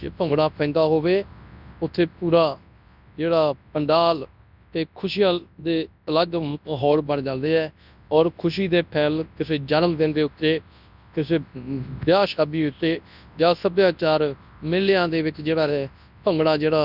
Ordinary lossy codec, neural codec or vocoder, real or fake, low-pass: none; codec, 24 kHz, 0.9 kbps, WavTokenizer, large speech release; fake; 5.4 kHz